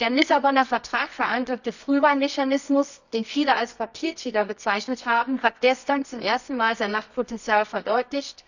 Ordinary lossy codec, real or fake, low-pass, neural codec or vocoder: none; fake; 7.2 kHz; codec, 24 kHz, 0.9 kbps, WavTokenizer, medium music audio release